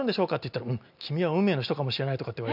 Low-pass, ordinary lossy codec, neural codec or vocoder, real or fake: 5.4 kHz; none; none; real